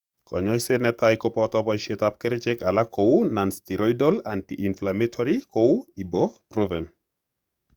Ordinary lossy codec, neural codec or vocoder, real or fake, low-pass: Opus, 64 kbps; codec, 44.1 kHz, 7.8 kbps, DAC; fake; 19.8 kHz